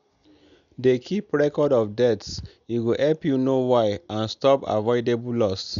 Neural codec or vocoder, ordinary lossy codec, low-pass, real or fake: none; none; 7.2 kHz; real